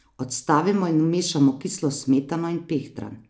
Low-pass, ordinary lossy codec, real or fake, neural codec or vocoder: none; none; real; none